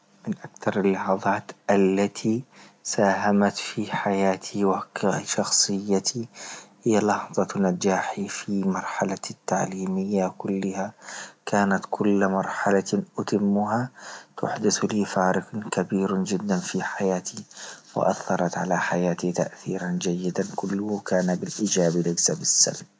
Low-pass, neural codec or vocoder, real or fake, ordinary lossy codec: none; none; real; none